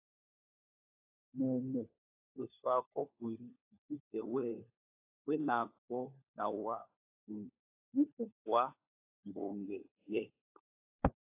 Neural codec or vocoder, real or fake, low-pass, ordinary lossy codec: codec, 16 kHz, 4 kbps, FunCodec, trained on LibriTTS, 50 frames a second; fake; 3.6 kHz; AAC, 24 kbps